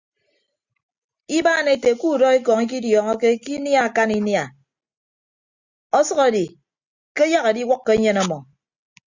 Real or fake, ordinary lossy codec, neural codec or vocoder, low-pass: real; Opus, 64 kbps; none; 7.2 kHz